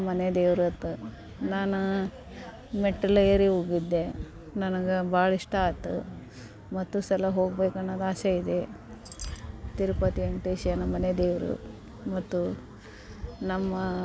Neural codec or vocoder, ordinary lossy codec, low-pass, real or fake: none; none; none; real